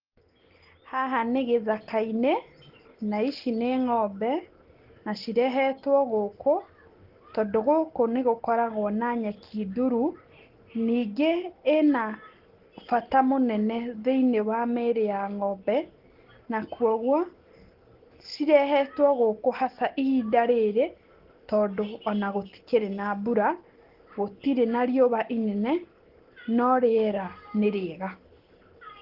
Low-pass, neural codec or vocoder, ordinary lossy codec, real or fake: 5.4 kHz; none; Opus, 16 kbps; real